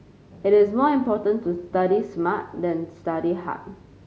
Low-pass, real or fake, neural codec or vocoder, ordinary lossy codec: none; real; none; none